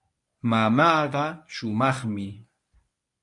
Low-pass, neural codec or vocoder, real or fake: 10.8 kHz; codec, 24 kHz, 0.9 kbps, WavTokenizer, medium speech release version 1; fake